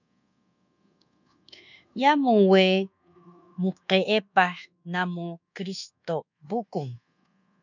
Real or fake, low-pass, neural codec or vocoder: fake; 7.2 kHz; codec, 24 kHz, 1.2 kbps, DualCodec